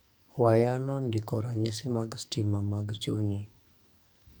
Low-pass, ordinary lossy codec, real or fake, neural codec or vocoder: none; none; fake; codec, 44.1 kHz, 2.6 kbps, SNAC